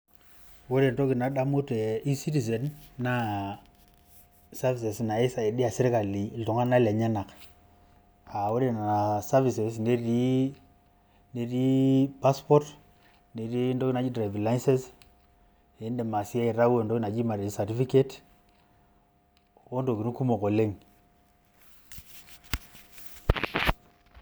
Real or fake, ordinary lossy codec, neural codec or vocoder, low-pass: real; none; none; none